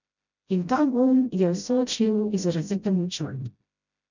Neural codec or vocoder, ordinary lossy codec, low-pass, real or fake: codec, 16 kHz, 0.5 kbps, FreqCodec, smaller model; none; 7.2 kHz; fake